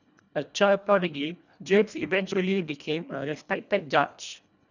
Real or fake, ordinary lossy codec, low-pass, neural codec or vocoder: fake; none; 7.2 kHz; codec, 24 kHz, 1.5 kbps, HILCodec